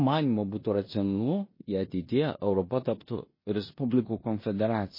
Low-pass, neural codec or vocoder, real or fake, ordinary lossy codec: 5.4 kHz; codec, 16 kHz in and 24 kHz out, 0.9 kbps, LongCat-Audio-Codec, four codebook decoder; fake; MP3, 24 kbps